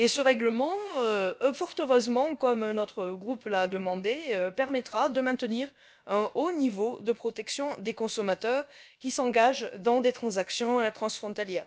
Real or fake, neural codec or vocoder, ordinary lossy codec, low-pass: fake; codec, 16 kHz, about 1 kbps, DyCAST, with the encoder's durations; none; none